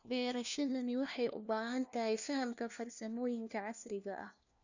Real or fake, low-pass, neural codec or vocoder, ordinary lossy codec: fake; 7.2 kHz; codec, 16 kHz, 1 kbps, FunCodec, trained on LibriTTS, 50 frames a second; none